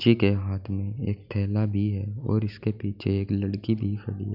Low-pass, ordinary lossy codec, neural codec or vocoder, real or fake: 5.4 kHz; none; none; real